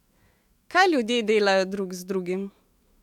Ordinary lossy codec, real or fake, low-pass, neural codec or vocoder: MP3, 96 kbps; fake; 19.8 kHz; autoencoder, 48 kHz, 128 numbers a frame, DAC-VAE, trained on Japanese speech